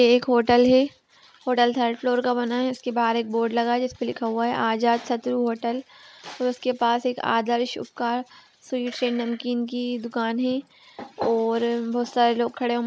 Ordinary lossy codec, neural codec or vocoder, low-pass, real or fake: none; none; none; real